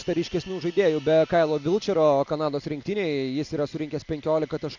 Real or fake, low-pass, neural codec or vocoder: real; 7.2 kHz; none